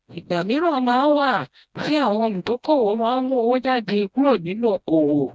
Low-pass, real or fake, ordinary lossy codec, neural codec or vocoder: none; fake; none; codec, 16 kHz, 1 kbps, FreqCodec, smaller model